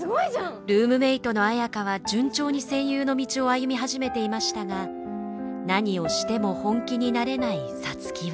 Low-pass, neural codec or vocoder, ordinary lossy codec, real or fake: none; none; none; real